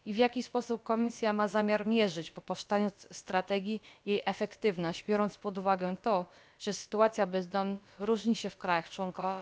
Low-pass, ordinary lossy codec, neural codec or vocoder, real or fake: none; none; codec, 16 kHz, about 1 kbps, DyCAST, with the encoder's durations; fake